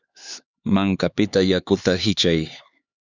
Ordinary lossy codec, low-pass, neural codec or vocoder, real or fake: Opus, 64 kbps; 7.2 kHz; codec, 16 kHz, 4 kbps, X-Codec, HuBERT features, trained on LibriSpeech; fake